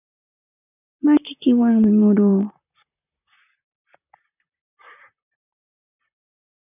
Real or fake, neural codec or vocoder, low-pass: fake; vocoder, 44.1 kHz, 128 mel bands, Pupu-Vocoder; 3.6 kHz